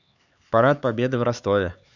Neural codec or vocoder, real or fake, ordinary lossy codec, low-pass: codec, 16 kHz, 4 kbps, X-Codec, HuBERT features, trained on LibriSpeech; fake; none; 7.2 kHz